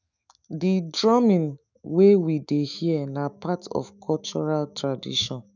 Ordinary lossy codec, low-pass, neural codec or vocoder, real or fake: none; 7.2 kHz; autoencoder, 48 kHz, 128 numbers a frame, DAC-VAE, trained on Japanese speech; fake